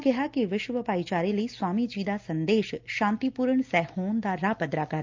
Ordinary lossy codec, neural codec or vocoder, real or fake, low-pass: Opus, 24 kbps; none; real; 7.2 kHz